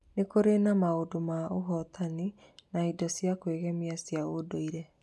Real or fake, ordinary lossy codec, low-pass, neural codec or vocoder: real; none; none; none